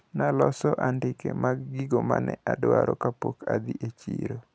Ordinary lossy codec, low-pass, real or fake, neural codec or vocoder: none; none; real; none